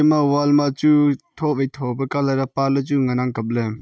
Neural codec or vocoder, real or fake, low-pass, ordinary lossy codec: none; real; none; none